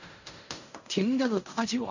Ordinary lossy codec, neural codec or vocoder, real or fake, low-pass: none; codec, 16 kHz in and 24 kHz out, 0.4 kbps, LongCat-Audio-Codec, fine tuned four codebook decoder; fake; 7.2 kHz